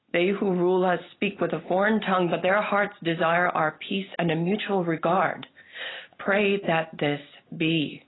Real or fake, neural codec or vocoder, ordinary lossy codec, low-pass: real; none; AAC, 16 kbps; 7.2 kHz